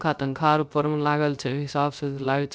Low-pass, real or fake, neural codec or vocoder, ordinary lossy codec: none; fake; codec, 16 kHz, 0.3 kbps, FocalCodec; none